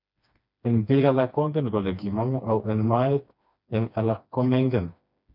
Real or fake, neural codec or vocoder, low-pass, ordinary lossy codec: fake; codec, 16 kHz, 2 kbps, FreqCodec, smaller model; 5.4 kHz; none